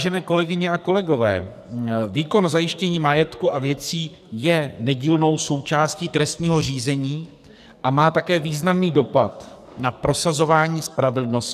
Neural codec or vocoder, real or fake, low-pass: codec, 44.1 kHz, 2.6 kbps, SNAC; fake; 14.4 kHz